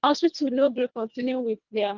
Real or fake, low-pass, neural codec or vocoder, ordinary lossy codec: fake; 7.2 kHz; codec, 24 kHz, 1.5 kbps, HILCodec; Opus, 32 kbps